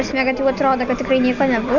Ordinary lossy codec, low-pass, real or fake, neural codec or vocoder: Opus, 64 kbps; 7.2 kHz; real; none